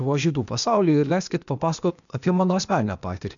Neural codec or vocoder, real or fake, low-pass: codec, 16 kHz, 0.8 kbps, ZipCodec; fake; 7.2 kHz